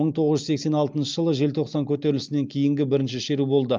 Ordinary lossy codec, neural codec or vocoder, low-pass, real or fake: Opus, 24 kbps; none; 7.2 kHz; real